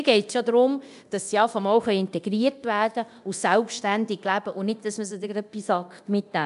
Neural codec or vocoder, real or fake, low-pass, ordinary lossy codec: codec, 24 kHz, 0.9 kbps, DualCodec; fake; 10.8 kHz; none